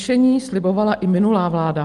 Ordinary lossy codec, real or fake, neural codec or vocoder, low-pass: Opus, 24 kbps; real; none; 10.8 kHz